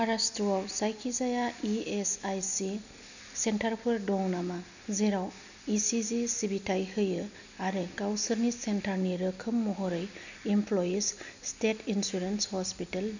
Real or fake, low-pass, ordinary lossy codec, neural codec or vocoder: real; 7.2 kHz; none; none